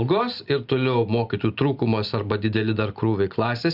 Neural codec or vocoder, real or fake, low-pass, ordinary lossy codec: none; real; 5.4 kHz; Opus, 64 kbps